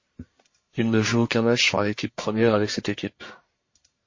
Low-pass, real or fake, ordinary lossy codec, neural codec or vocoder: 7.2 kHz; fake; MP3, 32 kbps; codec, 44.1 kHz, 1.7 kbps, Pupu-Codec